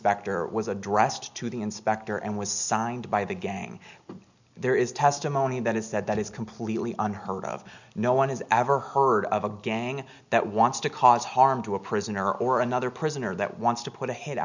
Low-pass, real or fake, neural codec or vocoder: 7.2 kHz; real; none